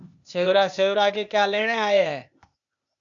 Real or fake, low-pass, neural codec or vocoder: fake; 7.2 kHz; codec, 16 kHz, 0.8 kbps, ZipCodec